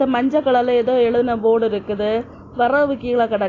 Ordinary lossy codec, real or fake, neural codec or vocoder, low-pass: AAC, 32 kbps; real; none; 7.2 kHz